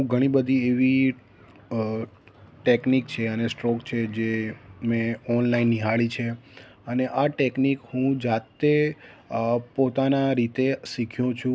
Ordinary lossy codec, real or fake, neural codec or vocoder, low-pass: none; real; none; none